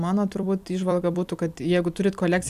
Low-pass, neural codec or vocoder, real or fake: 14.4 kHz; vocoder, 44.1 kHz, 128 mel bands every 256 samples, BigVGAN v2; fake